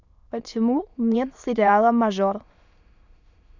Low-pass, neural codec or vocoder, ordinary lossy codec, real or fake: 7.2 kHz; autoencoder, 22.05 kHz, a latent of 192 numbers a frame, VITS, trained on many speakers; none; fake